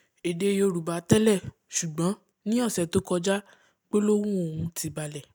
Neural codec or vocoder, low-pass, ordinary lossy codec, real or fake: none; none; none; real